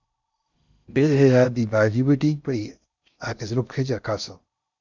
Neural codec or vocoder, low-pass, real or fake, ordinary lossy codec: codec, 16 kHz in and 24 kHz out, 0.6 kbps, FocalCodec, streaming, 4096 codes; 7.2 kHz; fake; Opus, 64 kbps